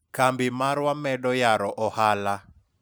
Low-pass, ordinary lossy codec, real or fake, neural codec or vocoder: none; none; real; none